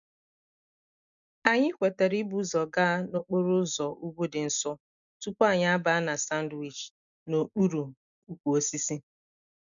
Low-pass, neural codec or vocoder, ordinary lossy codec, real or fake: 7.2 kHz; none; none; real